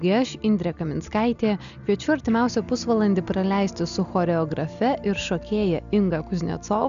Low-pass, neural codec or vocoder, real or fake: 7.2 kHz; none; real